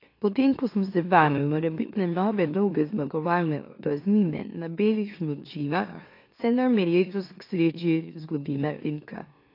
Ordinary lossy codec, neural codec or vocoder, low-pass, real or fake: AAC, 32 kbps; autoencoder, 44.1 kHz, a latent of 192 numbers a frame, MeloTTS; 5.4 kHz; fake